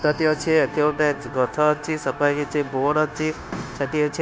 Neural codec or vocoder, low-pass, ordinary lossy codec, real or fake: codec, 16 kHz, 0.9 kbps, LongCat-Audio-Codec; none; none; fake